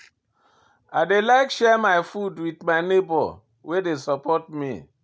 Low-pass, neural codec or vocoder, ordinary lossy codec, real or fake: none; none; none; real